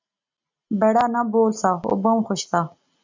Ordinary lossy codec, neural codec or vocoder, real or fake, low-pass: MP3, 64 kbps; none; real; 7.2 kHz